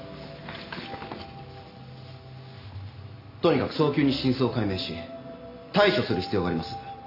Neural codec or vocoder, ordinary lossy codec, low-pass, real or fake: none; AAC, 32 kbps; 5.4 kHz; real